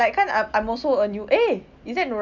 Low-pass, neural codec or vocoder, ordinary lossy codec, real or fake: 7.2 kHz; none; none; real